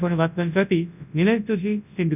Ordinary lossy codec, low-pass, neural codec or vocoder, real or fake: none; 3.6 kHz; codec, 24 kHz, 0.9 kbps, WavTokenizer, large speech release; fake